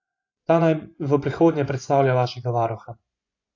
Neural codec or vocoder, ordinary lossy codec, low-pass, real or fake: vocoder, 24 kHz, 100 mel bands, Vocos; AAC, 48 kbps; 7.2 kHz; fake